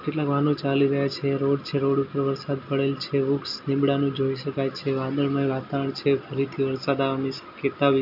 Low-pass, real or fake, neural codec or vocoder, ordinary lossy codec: 5.4 kHz; real; none; none